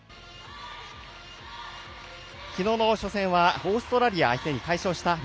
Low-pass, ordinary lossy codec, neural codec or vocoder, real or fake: none; none; none; real